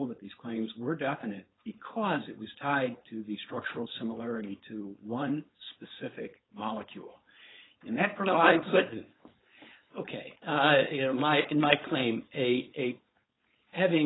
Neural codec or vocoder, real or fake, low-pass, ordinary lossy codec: codec, 16 kHz, 4.8 kbps, FACodec; fake; 7.2 kHz; AAC, 16 kbps